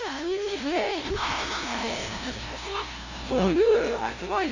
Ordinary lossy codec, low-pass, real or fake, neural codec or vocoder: none; 7.2 kHz; fake; codec, 16 kHz, 0.5 kbps, FunCodec, trained on LibriTTS, 25 frames a second